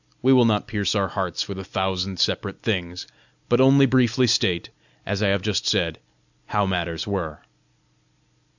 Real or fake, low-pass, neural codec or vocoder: real; 7.2 kHz; none